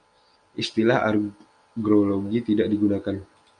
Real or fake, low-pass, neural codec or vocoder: real; 9.9 kHz; none